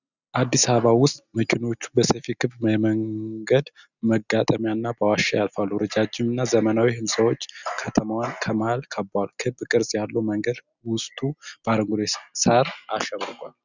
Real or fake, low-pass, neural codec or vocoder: real; 7.2 kHz; none